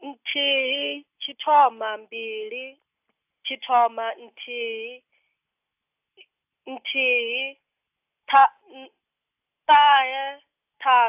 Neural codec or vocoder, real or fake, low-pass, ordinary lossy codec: none; real; 3.6 kHz; AAC, 32 kbps